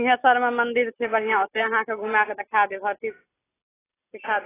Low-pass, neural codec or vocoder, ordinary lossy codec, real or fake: 3.6 kHz; none; AAC, 16 kbps; real